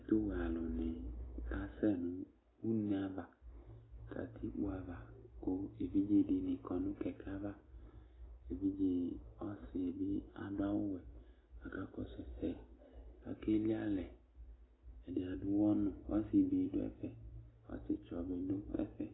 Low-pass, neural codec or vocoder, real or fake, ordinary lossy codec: 7.2 kHz; none; real; AAC, 16 kbps